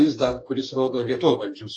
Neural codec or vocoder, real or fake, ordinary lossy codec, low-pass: codec, 44.1 kHz, 2.6 kbps, DAC; fake; AAC, 48 kbps; 9.9 kHz